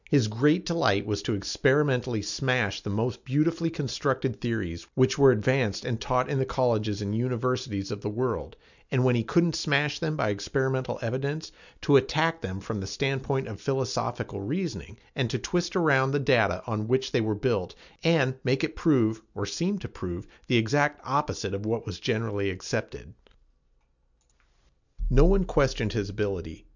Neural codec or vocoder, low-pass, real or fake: none; 7.2 kHz; real